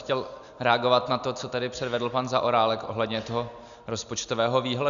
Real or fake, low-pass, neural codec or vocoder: real; 7.2 kHz; none